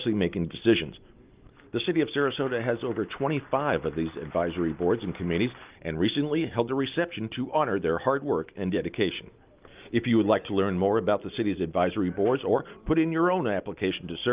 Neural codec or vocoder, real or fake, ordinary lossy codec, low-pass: none; real; Opus, 24 kbps; 3.6 kHz